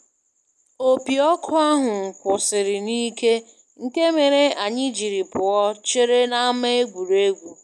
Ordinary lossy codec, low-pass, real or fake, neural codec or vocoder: none; none; real; none